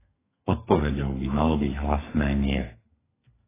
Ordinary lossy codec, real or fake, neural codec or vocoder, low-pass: AAC, 16 kbps; fake; codec, 32 kHz, 1.9 kbps, SNAC; 3.6 kHz